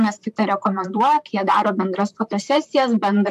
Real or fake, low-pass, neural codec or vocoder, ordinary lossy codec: fake; 14.4 kHz; vocoder, 44.1 kHz, 128 mel bands, Pupu-Vocoder; AAC, 96 kbps